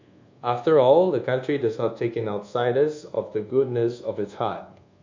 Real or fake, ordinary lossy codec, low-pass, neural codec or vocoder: fake; MP3, 48 kbps; 7.2 kHz; codec, 24 kHz, 1.2 kbps, DualCodec